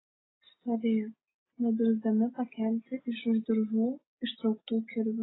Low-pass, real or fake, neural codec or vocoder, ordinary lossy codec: 7.2 kHz; real; none; AAC, 16 kbps